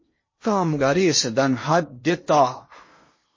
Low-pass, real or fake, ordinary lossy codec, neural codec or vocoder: 7.2 kHz; fake; MP3, 32 kbps; codec, 16 kHz in and 24 kHz out, 0.8 kbps, FocalCodec, streaming, 65536 codes